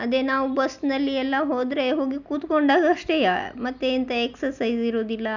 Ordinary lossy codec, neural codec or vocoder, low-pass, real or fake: none; none; 7.2 kHz; real